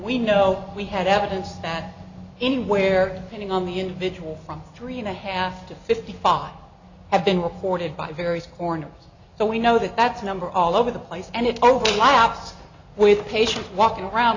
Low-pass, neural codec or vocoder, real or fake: 7.2 kHz; none; real